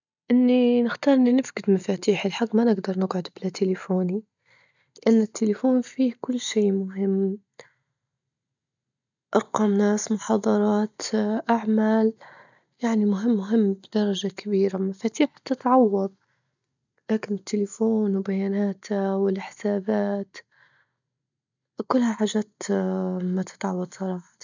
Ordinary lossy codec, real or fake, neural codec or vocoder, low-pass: none; real; none; 7.2 kHz